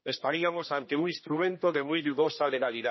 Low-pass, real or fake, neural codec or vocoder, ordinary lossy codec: 7.2 kHz; fake; codec, 16 kHz, 1 kbps, X-Codec, HuBERT features, trained on general audio; MP3, 24 kbps